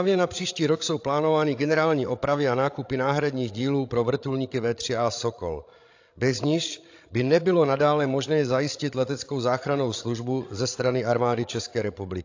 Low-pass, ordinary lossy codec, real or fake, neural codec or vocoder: 7.2 kHz; AAC, 48 kbps; fake; codec, 16 kHz, 16 kbps, FreqCodec, larger model